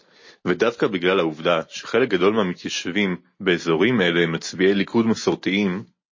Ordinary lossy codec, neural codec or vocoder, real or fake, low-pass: MP3, 32 kbps; none; real; 7.2 kHz